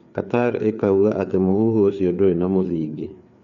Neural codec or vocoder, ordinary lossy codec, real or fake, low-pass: codec, 16 kHz, 4 kbps, FreqCodec, larger model; none; fake; 7.2 kHz